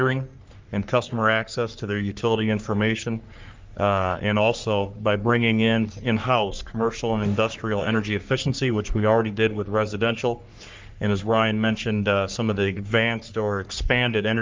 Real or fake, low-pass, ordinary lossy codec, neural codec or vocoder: fake; 7.2 kHz; Opus, 24 kbps; codec, 44.1 kHz, 3.4 kbps, Pupu-Codec